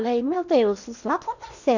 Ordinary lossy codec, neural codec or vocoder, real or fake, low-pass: none; codec, 16 kHz in and 24 kHz out, 0.8 kbps, FocalCodec, streaming, 65536 codes; fake; 7.2 kHz